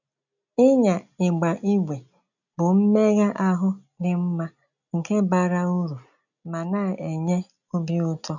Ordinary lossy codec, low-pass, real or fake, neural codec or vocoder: none; 7.2 kHz; real; none